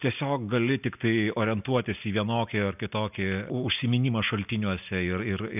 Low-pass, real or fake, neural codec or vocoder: 3.6 kHz; real; none